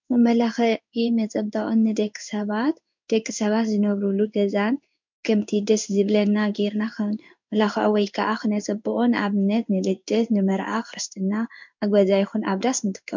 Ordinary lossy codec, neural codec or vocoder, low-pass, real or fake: MP3, 64 kbps; codec, 16 kHz in and 24 kHz out, 1 kbps, XY-Tokenizer; 7.2 kHz; fake